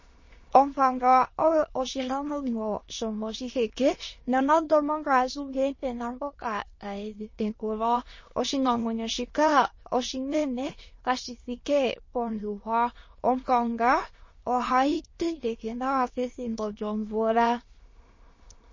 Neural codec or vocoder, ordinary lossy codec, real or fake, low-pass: autoencoder, 22.05 kHz, a latent of 192 numbers a frame, VITS, trained on many speakers; MP3, 32 kbps; fake; 7.2 kHz